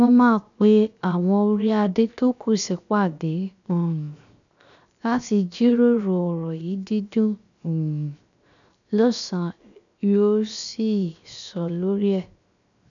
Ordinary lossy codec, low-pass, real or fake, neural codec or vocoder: none; 7.2 kHz; fake; codec, 16 kHz, 0.7 kbps, FocalCodec